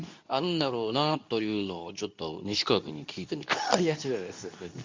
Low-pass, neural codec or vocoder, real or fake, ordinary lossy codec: 7.2 kHz; codec, 24 kHz, 0.9 kbps, WavTokenizer, medium speech release version 2; fake; MP3, 64 kbps